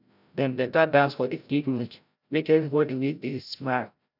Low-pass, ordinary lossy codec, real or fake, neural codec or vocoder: 5.4 kHz; none; fake; codec, 16 kHz, 0.5 kbps, FreqCodec, larger model